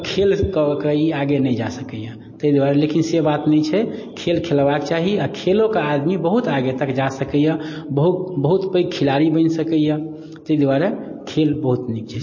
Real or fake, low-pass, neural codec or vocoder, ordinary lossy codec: real; 7.2 kHz; none; MP3, 32 kbps